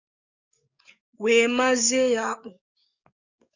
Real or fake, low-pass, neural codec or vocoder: fake; 7.2 kHz; codec, 44.1 kHz, 7.8 kbps, DAC